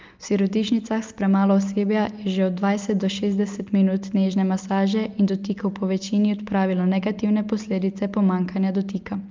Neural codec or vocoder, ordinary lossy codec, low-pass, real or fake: none; Opus, 24 kbps; 7.2 kHz; real